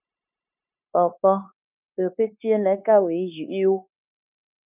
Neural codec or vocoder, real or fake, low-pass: codec, 16 kHz, 0.9 kbps, LongCat-Audio-Codec; fake; 3.6 kHz